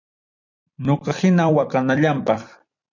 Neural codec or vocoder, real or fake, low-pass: vocoder, 44.1 kHz, 128 mel bands every 256 samples, BigVGAN v2; fake; 7.2 kHz